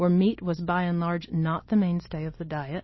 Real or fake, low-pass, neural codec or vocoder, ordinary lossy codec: real; 7.2 kHz; none; MP3, 24 kbps